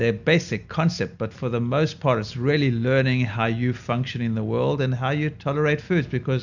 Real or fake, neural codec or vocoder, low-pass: real; none; 7.2 kHz